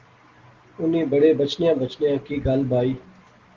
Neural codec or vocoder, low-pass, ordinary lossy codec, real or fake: none; 7.2 kHz; Opus, 16 kbps; real